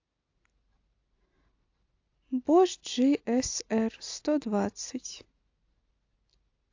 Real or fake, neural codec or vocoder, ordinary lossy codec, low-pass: real; none; AAC, 48 kbps; 7.2 kHz